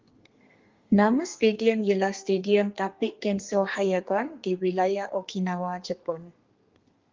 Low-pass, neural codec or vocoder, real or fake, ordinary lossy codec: 7.2 kHz; codec, 32 kHz, 1.9 kbps, SNAC; fake; Opus, 32 kbps